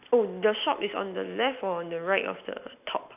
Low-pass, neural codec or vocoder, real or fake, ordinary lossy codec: 3.6 kHz; none; real; AAC, 32 kbps